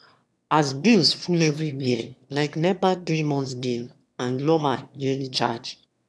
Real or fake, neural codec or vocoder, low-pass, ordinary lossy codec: fake; autoencoder, 22.05 kHz, a latent of 192 numbers a frame, VITS, trained on one speaker; none; none